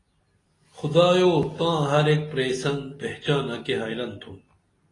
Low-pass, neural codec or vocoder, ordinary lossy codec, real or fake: 10.8 kHz; none; AAC, 32 kbps; real